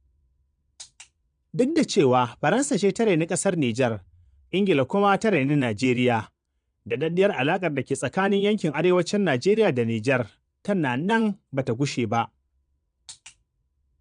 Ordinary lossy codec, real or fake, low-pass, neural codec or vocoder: none; fake; 9.9 kHz; vocoder, 22.05 kHz, 80 mel bands, Vocos